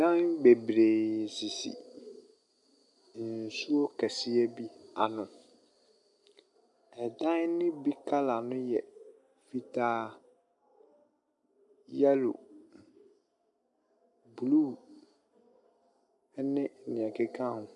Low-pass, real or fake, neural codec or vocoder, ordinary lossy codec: 10.8 kHz; real; none; MP3, 96 kbps